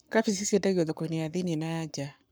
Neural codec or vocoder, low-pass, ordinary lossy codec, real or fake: codec, 44.1 kHz, 7.8 kbps, Pupu-Codec; none; none; fake